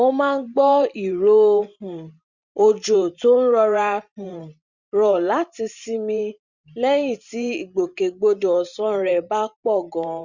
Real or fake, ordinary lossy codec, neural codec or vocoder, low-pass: fake; Opus, 64 kbps; vocoder, 44.1 kHz, 128 mel bands every 512 samples, BigVGAN v2; 7.2 kHz